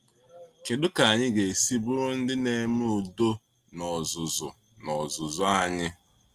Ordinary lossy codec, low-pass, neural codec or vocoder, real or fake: Opus, 24 kbps; 14.4 kHz; none; real